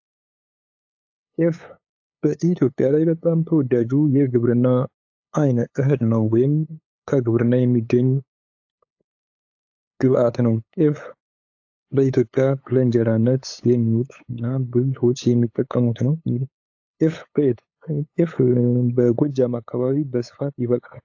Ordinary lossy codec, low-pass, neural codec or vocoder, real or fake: AAC, 48 kbps; 7.2 kHz; codec, 16 kHz, 8 kbps, FunCodec, trained on LibriTTS, 25 frames a second; fake